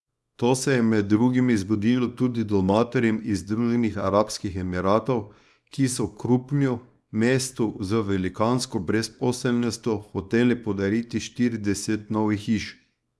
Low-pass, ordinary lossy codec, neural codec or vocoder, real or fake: none; none; codec, 24 kHz, 0.9 kbps, WavTokenizer, small release; fake